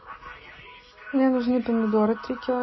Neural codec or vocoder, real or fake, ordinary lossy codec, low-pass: none; real; MP3, 24 kbps; 7.2 kHz